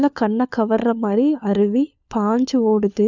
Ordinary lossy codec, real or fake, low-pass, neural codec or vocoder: none; fake; 7.2 kHz; codec, 16 kHz, 4 kbps, FunCodec, trained on LibriTTS, 50 frames a second